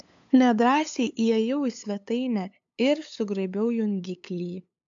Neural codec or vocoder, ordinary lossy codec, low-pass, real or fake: codec, 16 kHz, 8 kbps, FunCodec, trained on LibriTTS, 25 frames a second; AAC, 64 kbps; 7.2 kHz; fake